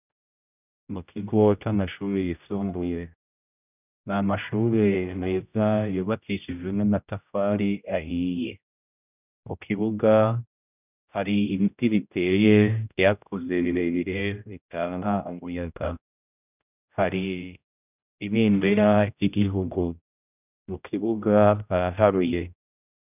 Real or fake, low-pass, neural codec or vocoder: fake; 3.6 kHz; codec, 16 kHz, 0.5 kbps, X-Codec, HuBERT features, trained on general audio